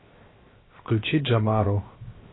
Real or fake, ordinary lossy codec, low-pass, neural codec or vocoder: fake; AAC, 16 kbps; 7.2 kHz; codec, 16 kHz, 0.7 kbps, FocalCodec